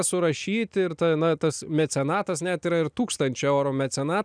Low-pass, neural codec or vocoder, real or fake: 9.9 kHz; none; real